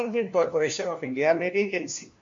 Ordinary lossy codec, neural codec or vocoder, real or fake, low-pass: MP3, 48 kbps; codec, 16 kHz, 1 kbps, FunCodec, trained on LibriTTS, 50 frames a second; fake; 7.2 kHz